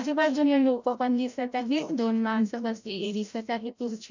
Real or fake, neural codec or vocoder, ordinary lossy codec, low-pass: fake; codec, 16 kHz, 0.5 kbps, FreqCodec, larger model; none; 7.2 kHz